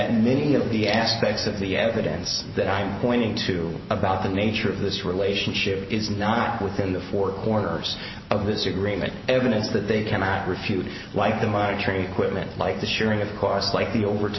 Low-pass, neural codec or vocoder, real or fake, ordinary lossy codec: 7.2 kHz; none; real; MP3, 24 kbps